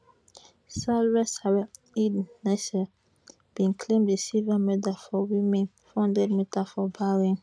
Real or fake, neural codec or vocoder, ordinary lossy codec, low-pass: real; none; none; none